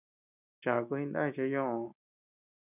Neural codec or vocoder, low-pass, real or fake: none; 3.6 kHz; real